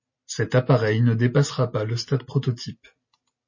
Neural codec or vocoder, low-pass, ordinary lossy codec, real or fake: none; 7.2 kHz; MP3, 32 kbps; real